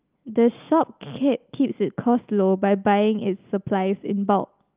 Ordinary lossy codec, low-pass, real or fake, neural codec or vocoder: Opus, 24 kbps; 3.6 kHz; real; none